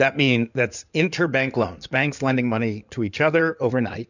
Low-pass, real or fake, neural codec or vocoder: 7.2 kHz; fake; codec, 16 kHz in and 24 kHz out, 2.2 kbps, FireRedTTS-2 codec